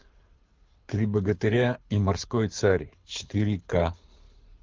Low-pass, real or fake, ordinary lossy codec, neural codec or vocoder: 7.2 kHz; fake; Opus, 24 kbps; codec, 24 kHz, 6 kbps, HILCodec